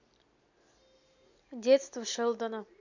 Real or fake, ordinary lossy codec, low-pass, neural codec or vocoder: real; none; 7.2 kHz; none